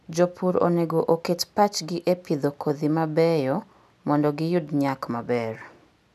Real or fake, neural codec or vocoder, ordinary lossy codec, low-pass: real; none; none; 14.4 kHz